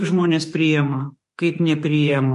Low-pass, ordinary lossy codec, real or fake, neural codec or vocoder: 14.4 kHz; MP3, 48 kbps; fake; autoencoder, 48 kHz, 32 numbers a frame, DAC-VAE, trained on Japanese speech